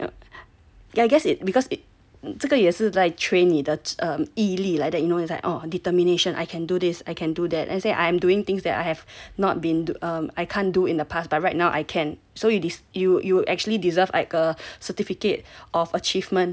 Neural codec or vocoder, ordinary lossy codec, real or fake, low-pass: none; none; real; none